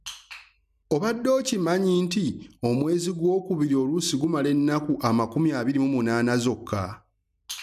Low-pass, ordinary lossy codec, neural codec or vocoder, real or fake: 14.4 kHz; none; none; real